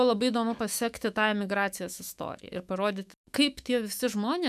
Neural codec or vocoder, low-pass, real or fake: autoencoder, 48 kHz, 128 numbers a frame, DAC-VAE, trained on Japanese speech; 14.4 kHz; fake